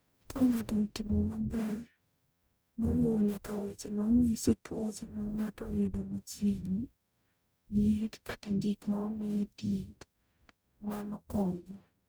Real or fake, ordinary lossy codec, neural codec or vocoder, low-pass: fake; none; codec, 44.1 kHz, 0.9 kbps, DAC; none